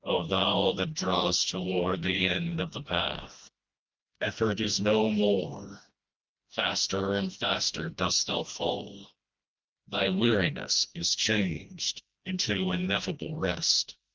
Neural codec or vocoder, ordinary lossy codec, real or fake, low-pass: codec, 16 kHz, 1 kbps, FreqCodec, smaller model; Opus, 24 kbps; fake; 7.2 kHz